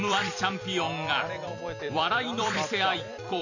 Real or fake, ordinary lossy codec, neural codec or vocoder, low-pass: fake; none; vocoder, 44.1 kHz, 128 mel bands every 256 samples, BigVGAN v2; 7.2 kHz